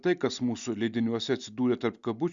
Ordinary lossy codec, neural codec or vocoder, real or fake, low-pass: Opus, 64 kbps; none; real; 7.2 kHz